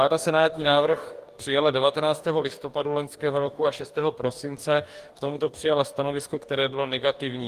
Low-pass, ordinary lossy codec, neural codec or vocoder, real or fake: 14.4 kHz; Opus, 24 kbps; codec, 44.1 kHz, 2.6 kbps, DAC; fake